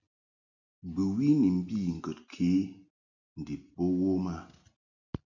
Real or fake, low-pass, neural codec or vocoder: real; 7.2 kHz; none